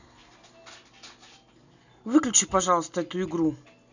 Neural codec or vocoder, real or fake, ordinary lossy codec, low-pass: none; real; none; 7.2 kHz